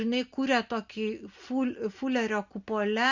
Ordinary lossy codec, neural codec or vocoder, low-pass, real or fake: Opus, 64 kbps; none; 7.2 kHz; real